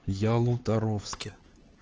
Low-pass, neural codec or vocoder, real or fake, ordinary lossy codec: 7.2 kHz; codec, 16 kHz, 4 kbps, X-Codec, HuBERT features, trained on LibriSpeech; fake; Opus, 16 kbps